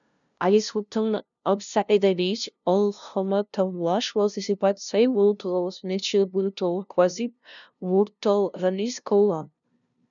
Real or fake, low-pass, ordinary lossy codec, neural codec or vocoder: fake; 7.2 kHz; MP3, 96 kbps; codec, 16 kHz, 0.5 kbps, FunCodec, trained on LibriTTS, 25 frames a second